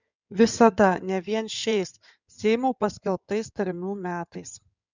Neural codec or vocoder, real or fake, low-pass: codec, 16 kHz in and 24 kHz out, 2.2 kbps, FireRedTTS-2 codec; fake; 7.2 kHz